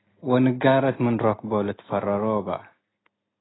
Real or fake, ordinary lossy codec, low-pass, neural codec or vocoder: real; AAC, 16 kbps; 7.2 kHz; none